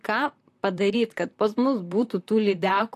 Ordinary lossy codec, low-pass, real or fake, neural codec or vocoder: AAC, 64 kbps; 14.4 kHz; fake; vocoder, 44.1 kHz, 128 mel bands, Pupu-Vocoder